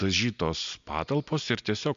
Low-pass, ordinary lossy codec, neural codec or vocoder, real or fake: 7.2 kHz; MP3, 64 kbps; none; real